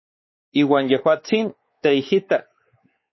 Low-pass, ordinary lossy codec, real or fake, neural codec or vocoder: 7.2 kHz; MP3, 24 kbps; fake; codec, 16 kHz, 4 kbps, X-Codec, WavLM features, trained on Multilingual LibriSpeech